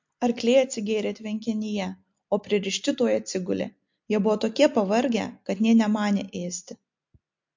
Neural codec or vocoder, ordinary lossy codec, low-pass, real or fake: none; MP3, 48 kbps; 7.2 kHz; real